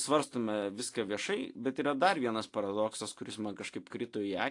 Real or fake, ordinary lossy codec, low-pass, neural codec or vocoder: real; AAC, 48 kbps; 10.8 kHz; none